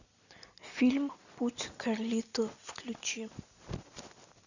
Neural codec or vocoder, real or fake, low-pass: none; real; 7.2 kHz